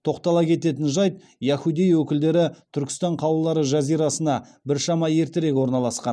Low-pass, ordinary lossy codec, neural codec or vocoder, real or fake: none; none; none; real